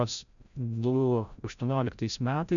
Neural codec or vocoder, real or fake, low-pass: codec, 16 kHz, 0.5 kbps, FreqCodec, larger model; fake; 7.2 kHz